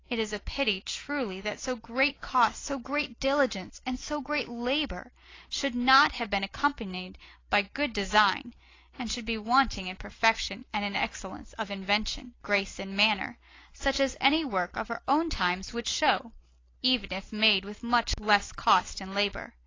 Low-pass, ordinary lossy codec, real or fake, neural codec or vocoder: 7.2 kHz; AAC, 32 kbps; real; none